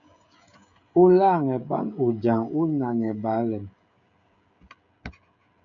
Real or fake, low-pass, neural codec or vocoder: fake; 7.2 kHz; codec, 16 kHz, 16 kbps, FreqCodec, smaller model